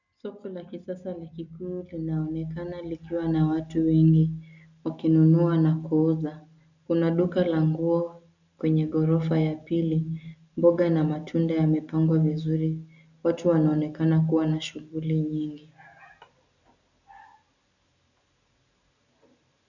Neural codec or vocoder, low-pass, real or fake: none; 7.2 kHz; real